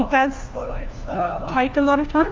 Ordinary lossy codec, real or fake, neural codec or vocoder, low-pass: Opus, 24 kbps; fake; codec, 16 kHz, 1 kbps, FunCodec, trained on LibriTTS, 50 frames a second; 7.2 kHz